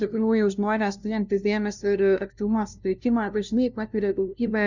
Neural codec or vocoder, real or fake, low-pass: codec, 16 kHz, 0.5 kbps, FunCodec, trained on LibriTTS, 25 frames a second; fake; 7.2 kHz